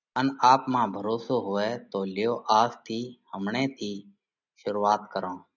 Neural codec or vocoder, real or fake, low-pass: none; real; 7.2 kHz